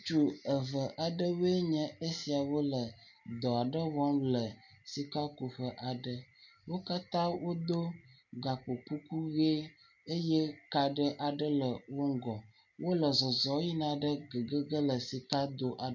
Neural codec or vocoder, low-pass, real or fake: none; 7.2 kHz; real